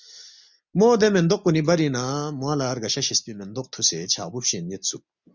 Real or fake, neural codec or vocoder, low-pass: real; none; 7.2 kHz